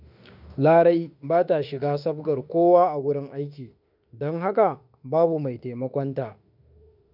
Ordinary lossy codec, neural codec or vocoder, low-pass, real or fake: none; autoencoder, 48 kHz, 32 numbers a frame, DAC-VAE, trained on Japanese speech; 5.4 kHz; fake